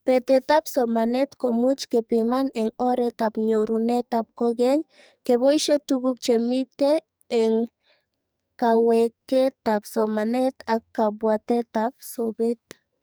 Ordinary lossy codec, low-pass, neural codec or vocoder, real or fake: none; none; codec, 44.1 kHz, 2.6 kbps, SNAC; fake